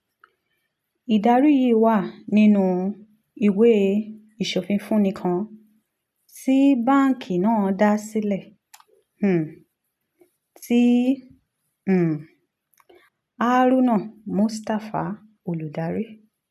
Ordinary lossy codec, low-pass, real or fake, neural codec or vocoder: none; 14.4 kHz; real; none